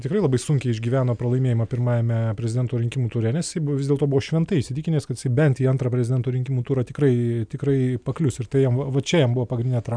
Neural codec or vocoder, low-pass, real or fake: none; 9.9 kHz; real